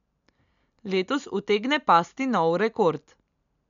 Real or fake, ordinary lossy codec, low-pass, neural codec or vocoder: real; none; 7.2 kHz; none